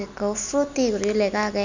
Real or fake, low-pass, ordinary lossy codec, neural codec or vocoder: real; 7.2 kHz; none; none